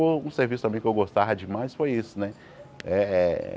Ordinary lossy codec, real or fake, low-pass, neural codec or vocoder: none; real; none; none